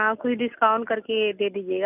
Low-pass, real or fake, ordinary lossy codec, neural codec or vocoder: 3.6 kHz; real; none; none